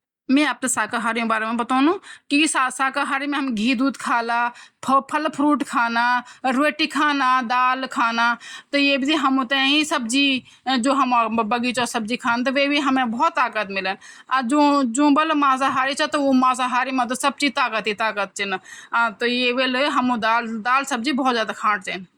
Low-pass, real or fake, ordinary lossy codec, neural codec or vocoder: 19.8 kHz; real; Opus, 64 kbps; none